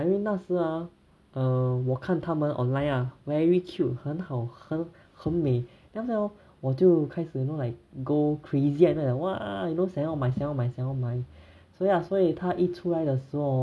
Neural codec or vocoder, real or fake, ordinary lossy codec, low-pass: none; real; none; none